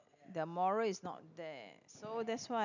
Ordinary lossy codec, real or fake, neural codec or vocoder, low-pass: none; real; none; 7.2 kHz